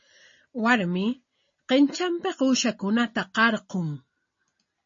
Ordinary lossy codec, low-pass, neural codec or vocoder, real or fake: MP3, 32 kbps; 7.2 kHz; none; real